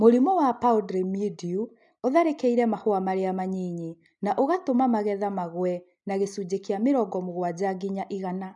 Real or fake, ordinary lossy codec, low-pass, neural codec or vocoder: real; none; 10.8 kHz; none